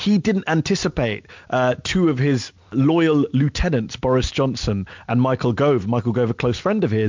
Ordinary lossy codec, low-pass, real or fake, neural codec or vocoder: MP3, 64 kbps; 7.2 kHz; real; none